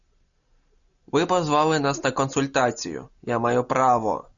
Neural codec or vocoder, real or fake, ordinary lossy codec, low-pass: none; real; MP3, 96 kbps; 7.2 kHz